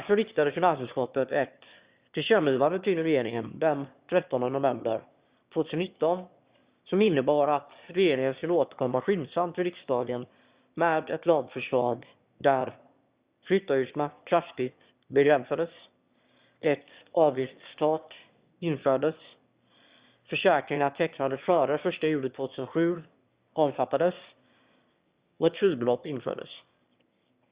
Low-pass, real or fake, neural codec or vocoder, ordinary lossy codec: 3.6 kHz; fake; autoencoder, 22.05 kHz, a latent of 192 numbers a frame, VITS, trained on one speaker; Opus, 64 kbps